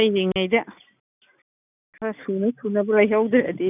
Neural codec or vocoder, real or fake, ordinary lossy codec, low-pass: none; real; none; 3.6 kHz